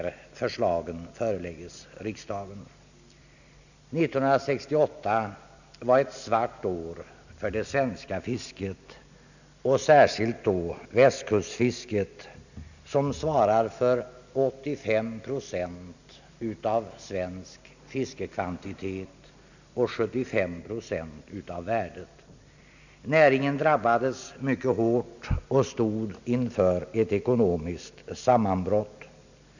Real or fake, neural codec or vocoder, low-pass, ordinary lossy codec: real; none; 7.2 kHz; none